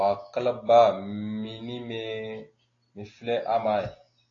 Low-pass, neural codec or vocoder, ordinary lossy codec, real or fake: 7.2 kHz; none; AAC, 32 kbps; real